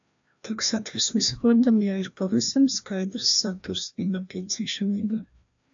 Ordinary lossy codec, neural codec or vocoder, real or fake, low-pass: AAC, 64 kbps; codec, 16 kHz, 1 kbps, FreqCodec, larger model; fake; 7.2 kHz